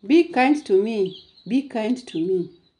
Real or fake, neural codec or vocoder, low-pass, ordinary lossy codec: real; none; 10.8 kHz; none